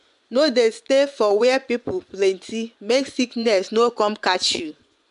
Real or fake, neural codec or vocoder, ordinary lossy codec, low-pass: fake; vocoder, 24 kHz, 100 mel bands, Vocos; none; 10.8 kHz